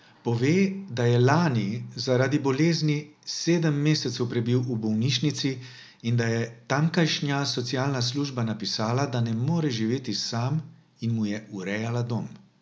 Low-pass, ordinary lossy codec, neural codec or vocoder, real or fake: none; none; none; real